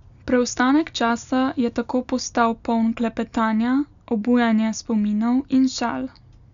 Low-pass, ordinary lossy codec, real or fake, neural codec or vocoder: 7.2 kHz; none; real; none